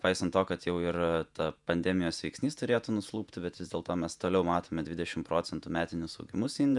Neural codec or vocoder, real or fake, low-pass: vocoder, 48 kHz, 128 mel bands, Vocos; fake; 10.8 kHz